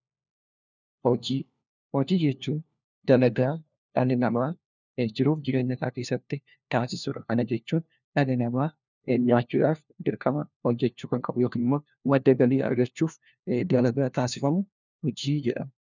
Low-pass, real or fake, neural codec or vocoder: 7.2 kHz; fake; codec, 16 kHz, 1 kbps, FunCodec, trained on LibriTTS, 50 frames a second